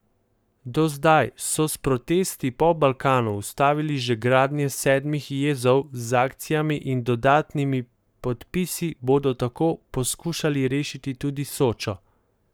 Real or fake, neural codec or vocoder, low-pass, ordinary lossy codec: fake; codec, 44.1 kHz, 7.8 kbps, Pupu-Codec; none; none